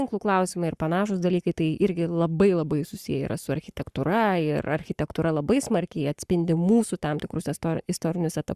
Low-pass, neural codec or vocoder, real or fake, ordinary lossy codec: 14.4 kHz; codec, 44.1 kHz, 7.8 kbps, Pupu-Codec; fake; Opus, 64 kbps